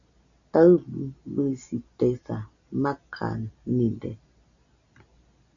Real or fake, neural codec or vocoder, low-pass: real; none; 7.2 kHz